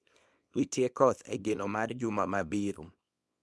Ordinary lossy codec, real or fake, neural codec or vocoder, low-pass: none; fake; codec, 24 kHz, 0.9 kbps, WavTokenizer, small release; none